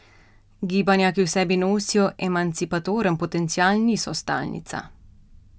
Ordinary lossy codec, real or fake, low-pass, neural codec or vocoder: none; real; none; none